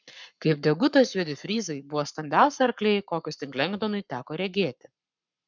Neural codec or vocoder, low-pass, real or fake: codec, 44.1 kHz, 7.8 kbps, Pupu-Codec; 7.2 kHz; fake